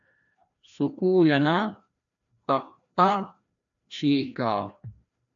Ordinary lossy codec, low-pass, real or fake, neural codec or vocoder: AAC, 64 kbps; 7.2 kHz; fake; codec, 16 kHz, 1 kbps, FreqCodec, larger model